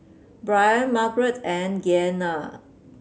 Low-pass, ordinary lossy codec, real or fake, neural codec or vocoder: none; none; real; none